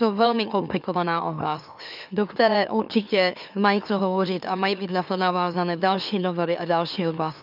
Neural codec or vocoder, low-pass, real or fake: autoencoder, 44.1 kHz, a latent of 192 numbers a frame, MeloTTS; 5.4 kHz; fake